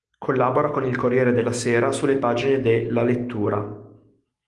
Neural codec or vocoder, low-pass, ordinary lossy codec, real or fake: autoencoder, 48 kHz, 128 numbers a frame, DAC-VAE, trained on Japanese speech; 10.8 kHz; Opus, 24 kbps; fake